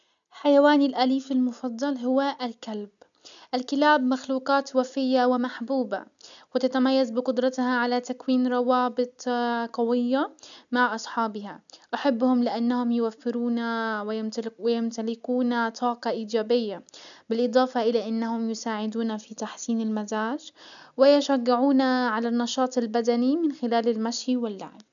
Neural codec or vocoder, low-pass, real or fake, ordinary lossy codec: none; 7.2 kHz; real; none